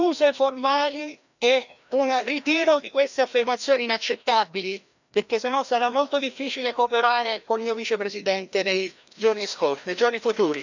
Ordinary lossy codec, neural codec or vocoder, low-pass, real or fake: none; codec, 16 kHz, 1 kbps, FreqCodec, larger model; 7.2 kHz; fake